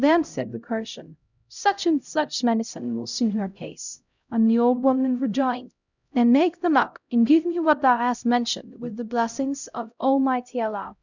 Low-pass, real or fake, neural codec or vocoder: 7.2 kHz; fake; codec, 16 kHz, 0.5 kbps, X-Codec, HuBERT features, trained on LibriSpeech